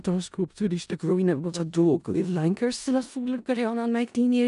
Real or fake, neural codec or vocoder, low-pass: fake; codec, 16 kHz in and 24 kHz out, 0.4 kbps, LongCat-Audio-Codec, four codebook decoder; 10.8 kHz